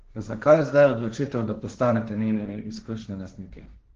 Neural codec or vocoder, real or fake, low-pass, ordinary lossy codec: codec, 16 kHz, 1.1 kbps, Voila-Tokenizer; fake; 7.2 kHz; Opus, 16 kbps